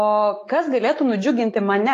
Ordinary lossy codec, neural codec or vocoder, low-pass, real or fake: AAC, 48 kbps; autoencoder, 48 kHz, 128 numbers a frame, DAC-VAE, trained on Japanese speech; 14.4 kHz; fake